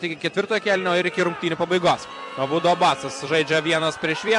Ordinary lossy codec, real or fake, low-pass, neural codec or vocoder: MP3, 64 kbps; fake; 10.8 kHz; vocoder, 44.1 kHz, 128 mel bands every 256 samples, BigVGAN v2